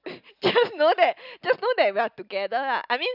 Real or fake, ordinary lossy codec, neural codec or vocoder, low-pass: real; none; none; 5.4 kHz